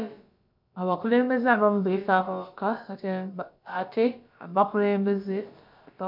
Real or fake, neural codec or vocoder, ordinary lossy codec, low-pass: fake; codec, 16 kHz, about 1 kbps, DyCAST, with the encoder's durations; MP3, 48 kbps; 5.4 kHz